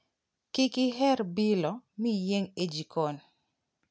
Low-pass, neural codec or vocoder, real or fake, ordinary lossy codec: none; none; real; none